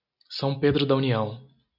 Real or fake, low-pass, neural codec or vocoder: real; 5.4 kHz; none